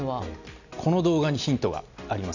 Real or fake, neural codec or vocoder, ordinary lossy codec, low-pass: real; none; none; 7.2 kHz